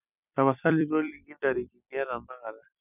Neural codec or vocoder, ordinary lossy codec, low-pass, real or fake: vocoder, 44.1 kHz, 128 mel bands every 256 samples, BigVGAN v2; none; 3.6 kHz; fake